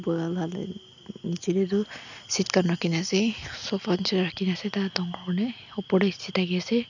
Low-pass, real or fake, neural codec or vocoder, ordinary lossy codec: 7.2 kHz; real; none; none